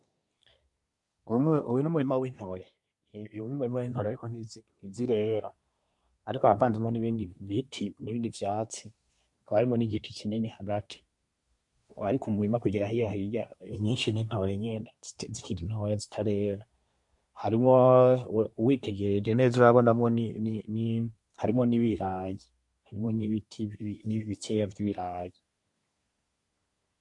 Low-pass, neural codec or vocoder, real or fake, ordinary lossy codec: 10.8 kHz; codec, 24 kHz, 1 kbps, SNAC; fake; MP3, 64 kbps